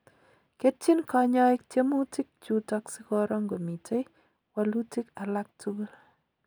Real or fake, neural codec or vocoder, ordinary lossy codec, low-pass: real; none; none; none